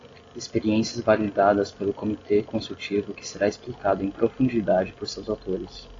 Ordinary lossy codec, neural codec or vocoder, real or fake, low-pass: AAC, 32 kbps; none; real; 7.2 kHz